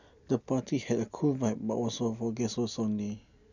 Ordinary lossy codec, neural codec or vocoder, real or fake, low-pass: none; none; real; 7.2 kHz